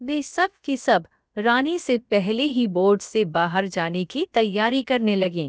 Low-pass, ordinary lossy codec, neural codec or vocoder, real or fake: none; none; codec, 16 kHz, about 1 kbps, DyCAST, with the encoder's durations; fake